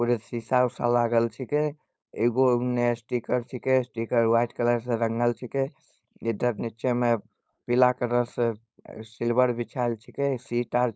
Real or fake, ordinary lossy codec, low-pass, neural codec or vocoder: fake; none; none; codec, 16 kHz, 4.8 kbps, FACodec